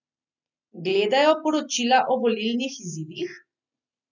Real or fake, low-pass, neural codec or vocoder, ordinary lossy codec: real; 7.2 kHz; none; none